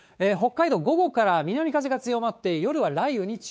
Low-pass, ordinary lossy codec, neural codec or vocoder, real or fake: none; none; codec, 16 kHz, 4 kbps, X-Codec, WavLM features, trained on Multilingual LibriSpeech; fake